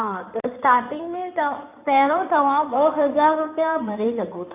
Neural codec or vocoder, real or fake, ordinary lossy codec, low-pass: codec, 16 kHz in and 24 kHz out, 2.2 kbps, FireRedTTS-2 codec; fake; none; 3.6 kHz